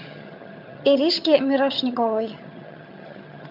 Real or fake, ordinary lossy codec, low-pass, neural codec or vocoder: fake; MP3, 48 kbps; 5.4 kHz; vocoder, 22.05 kHz, 80 mel bands, HiFi-GAN